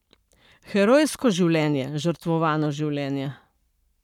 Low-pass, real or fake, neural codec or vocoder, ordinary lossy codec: 19.8 kHz; fake; codec, 44.1 kHz, 7.8 kbps, Pupu-Codec; none